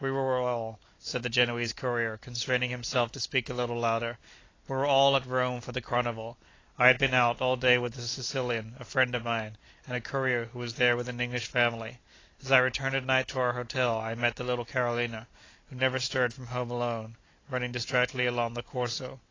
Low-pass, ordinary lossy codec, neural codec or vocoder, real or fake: 7.2 kHz; AAC, 32 kbps; none; real